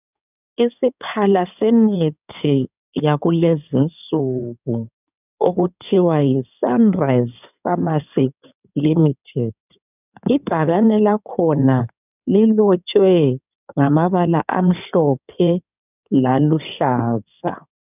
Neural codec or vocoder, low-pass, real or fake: codec, 16 kHz in and 24 kHz out, 2.2 kbps, FireRedTTS-2 codec; 3.6 kHz; fake